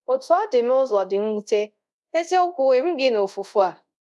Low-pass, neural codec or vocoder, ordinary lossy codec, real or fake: none; codec, 24 kHz, 0.5 kbps, DualCodec; none; fake